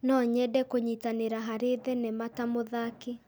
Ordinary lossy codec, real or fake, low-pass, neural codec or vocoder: none; real; none; none